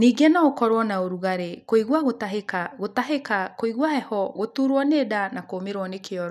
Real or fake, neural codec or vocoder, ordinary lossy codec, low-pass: real; none; none; 14.4 kHz